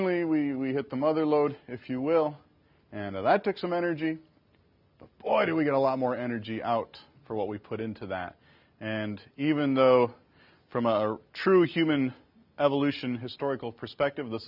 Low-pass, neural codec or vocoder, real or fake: 5.4 kHz; none; real